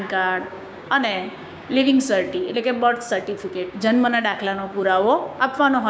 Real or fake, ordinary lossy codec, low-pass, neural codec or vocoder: fake; none; none; codec, 16 kHz, 6 kbps, DAC